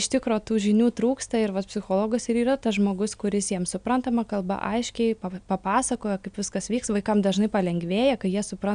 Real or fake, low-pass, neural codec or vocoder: real; 9.9 kHz; none